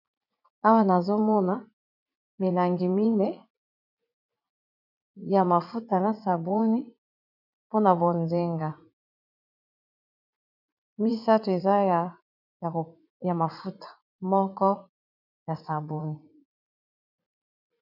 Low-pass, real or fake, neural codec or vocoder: 5.4 kHz; fake; vocoder, 44.1 kHz, 80 mel bands, Vocos